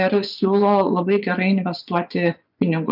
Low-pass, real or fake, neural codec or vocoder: 5.4 kHz; fake; vocoder, 24 kHz, 100 mel bands, Vocos